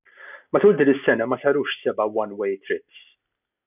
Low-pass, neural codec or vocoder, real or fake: 3.6 kHz; none; real